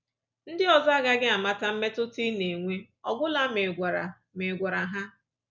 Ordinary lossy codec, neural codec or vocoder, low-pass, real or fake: none; none; 7.2 kHz; real